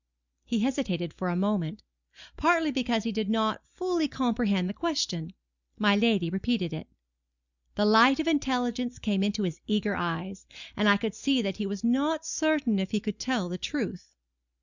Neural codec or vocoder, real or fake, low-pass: none; real; 7.2 kHz